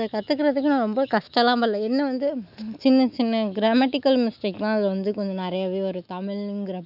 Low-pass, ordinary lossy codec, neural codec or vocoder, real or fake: 5.4 kHz; none; autoencoder, 48 kHz, 128 numbers a frame, DAC-VAE, trained on Japanese speech; fake